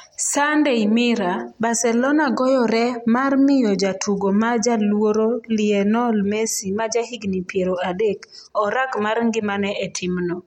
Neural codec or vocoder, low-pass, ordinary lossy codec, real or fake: none; 19.8 kHz; MP3, 64 kbps; real